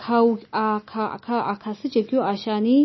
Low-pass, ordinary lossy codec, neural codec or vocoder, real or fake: 7.2 kHz; MP3, 24 kbps; none; real